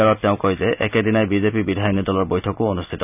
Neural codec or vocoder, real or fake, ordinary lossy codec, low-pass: none; real; none; 3.6 kHz